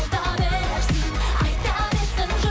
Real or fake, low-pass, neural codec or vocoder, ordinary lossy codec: real; none; none; none